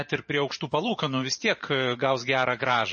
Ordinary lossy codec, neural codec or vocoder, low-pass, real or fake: MP3, 32 kbps; vocoder, 44.1 kHz, 128 mel bands every 512 samples, BigVGAN v2; 9.9 kHz; fake